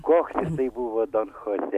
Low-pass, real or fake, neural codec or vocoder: 14.4 kHz; real; none